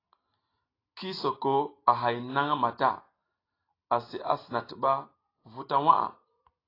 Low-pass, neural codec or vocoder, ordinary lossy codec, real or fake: 5.4 kHz; none; AAC, 24 kbps; real